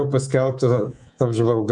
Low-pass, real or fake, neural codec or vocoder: 10.8 kHz; fake; codec, 24 kHz, 3.1 kbps, DualCodec